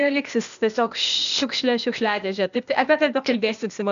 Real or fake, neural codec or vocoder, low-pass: fake; codec, 16 kHz, 0.8 kbps, ZipCodec; 7.2 kHz